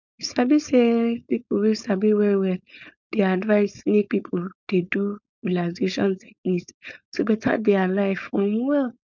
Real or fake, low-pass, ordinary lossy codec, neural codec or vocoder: fake; 7.2 kHz; none; codec, 16 kHz, 4.8 kbps, FACodec